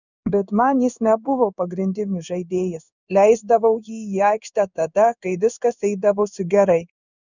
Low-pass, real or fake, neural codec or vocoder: 7.2 kHz; fake; codec, 16 kHz in and 24 kHz out, 1 kbps, XY-Tokenizer